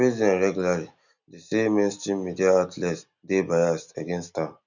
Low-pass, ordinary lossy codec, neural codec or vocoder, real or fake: 7.2 kHz; none; none; real